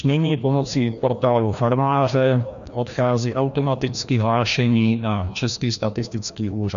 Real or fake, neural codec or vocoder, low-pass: fake; codec, 16 kHz, 1 kbps, FreqCodec, larger model; 7.2 kHz